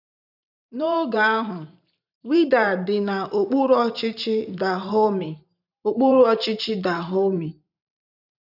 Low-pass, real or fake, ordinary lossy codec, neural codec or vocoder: 5.4 kHz; fake; none; vocoder, 44.1 kHz, 128 mel bands every 512 samples, BigVGAN v2